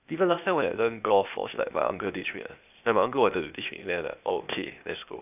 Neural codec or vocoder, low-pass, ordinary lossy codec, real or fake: codec, 16 kHz, 0.8 kbps, ZipCodec; 3.6 kHz; none; fake